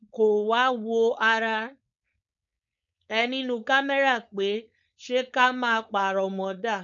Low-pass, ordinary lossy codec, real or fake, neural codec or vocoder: 7.2 kHz; none; fake; codec, 16 kHz, 4.8 kbps, FACodec